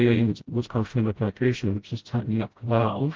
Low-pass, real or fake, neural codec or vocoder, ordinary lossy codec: 7.2 kHz; fake; codec, 16 kHz, 0.5 kbps, FreqCodec, smaller model; Opus, 16 kbps